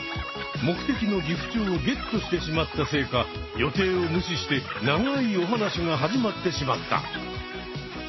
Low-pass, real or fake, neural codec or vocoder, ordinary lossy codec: 7.2 kHz; real; none; MP3, 24 kbps